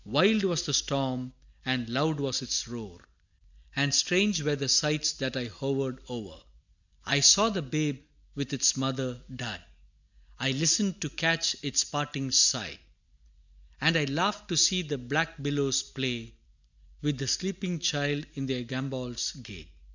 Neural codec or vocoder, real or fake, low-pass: none; real; 7.2 kHz